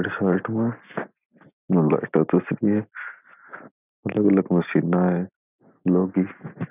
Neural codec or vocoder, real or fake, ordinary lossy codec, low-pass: none; real; none; 3.6 kHz